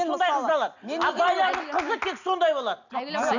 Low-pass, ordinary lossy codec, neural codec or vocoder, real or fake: 7.2 kHz; none; none; real